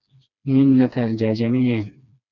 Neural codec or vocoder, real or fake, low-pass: codec, 16 kHz, 2 kbps, FreqCodec, smaller model; fake; 7.2 kHz